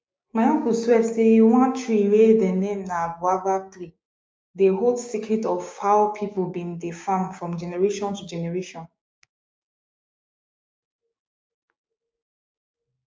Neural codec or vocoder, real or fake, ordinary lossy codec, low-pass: codec, 16 kHz, 6 kbps, DAC; fake; none; none